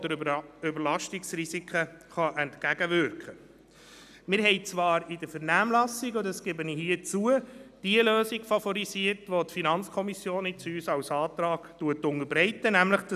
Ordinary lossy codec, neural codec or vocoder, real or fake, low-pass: none; none; real; 14.4 kHz